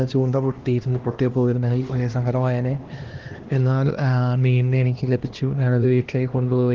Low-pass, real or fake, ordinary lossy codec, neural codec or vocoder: 7.2 kHz; fake; Opus, 16 kbps; codec, 16 kHz, 1 kbps, X-Codec, HuBERT features, trained on LibriSpeech